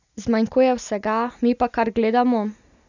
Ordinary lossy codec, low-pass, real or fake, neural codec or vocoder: none; 7.2 kHz; real; none